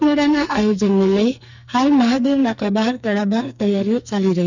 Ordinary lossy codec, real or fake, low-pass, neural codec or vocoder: none; fake; 7.2 kHz; codec, 44.1 kHz, 2.6 kbps, SNAC